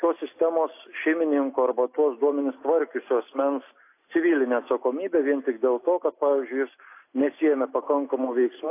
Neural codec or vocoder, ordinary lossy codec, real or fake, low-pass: none; AAC, 24 kbps; real; 3.6 kHz